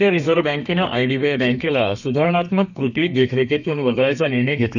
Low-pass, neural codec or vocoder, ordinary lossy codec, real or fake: 7.2 kHz; codec, 32 kHz, 1.9 kbps, SNAC; none; fake